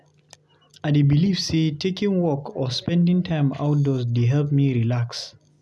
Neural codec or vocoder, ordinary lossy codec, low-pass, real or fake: none; none; none; real